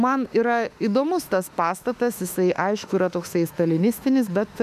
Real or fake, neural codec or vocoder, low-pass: fake; autoencoder, 48 kHz, 32 numbers a frame, DAC-VAE, trained on Japanese speech; 14.4 kHz